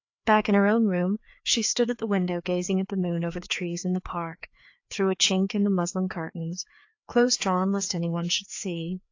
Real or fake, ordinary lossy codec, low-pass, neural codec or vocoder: fake; AAC, 48 kbps; 7.2 kHz; codec, 16 kHz, 2 kbps, FreqCodec, larger model